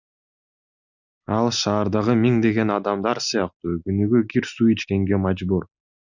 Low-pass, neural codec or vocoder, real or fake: 7.2 kHz; none; real